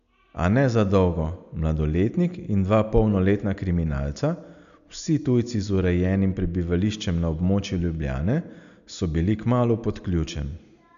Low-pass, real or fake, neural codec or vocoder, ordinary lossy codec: 7.2 kHz; real; none; none